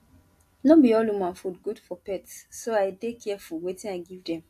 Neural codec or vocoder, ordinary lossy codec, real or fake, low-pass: none; AAC, 64 kbps; real; 14.4 kHz